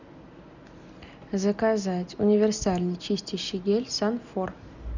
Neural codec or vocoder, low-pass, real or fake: none; 7.2 kHz; real